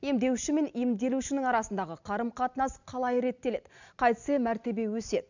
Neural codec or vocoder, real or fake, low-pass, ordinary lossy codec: none; real; 7.2 kHz; none